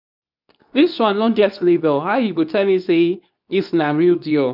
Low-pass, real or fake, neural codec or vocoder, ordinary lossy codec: 5.4 kHz; fake; codec, 24 kHz, 0.9 kbps, WavTokenizer, medium speech release version 2; none